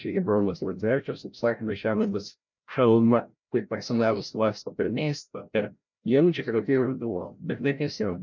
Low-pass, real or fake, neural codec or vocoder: 7.2 kHz; fake; codec, 16 kHz, 0.5 kbps, FreqCodec, larger model